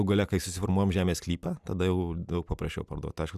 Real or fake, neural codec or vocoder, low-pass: real; none; 14.4 kHz